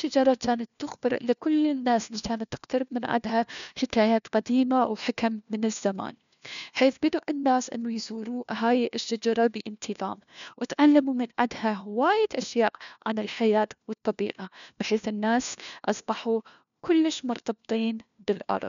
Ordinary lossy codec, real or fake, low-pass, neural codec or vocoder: MP3, 96 kbps; fake; 7.2 kHz; codec, 16 kHz, 1 kbps, FunCodec, trained on LibriTTS, 50 frames a second